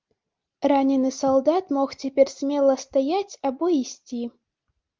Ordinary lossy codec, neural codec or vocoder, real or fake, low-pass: Opus, 32 kbps; none; real; 7.2 kHz